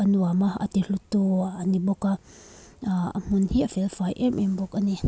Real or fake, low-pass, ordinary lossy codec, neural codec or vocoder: real; none; none; none